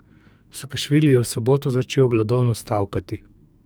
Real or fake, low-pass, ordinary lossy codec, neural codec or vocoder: fake; none; none; codec, 44.1 kHz, 2.6 kbps, SNAC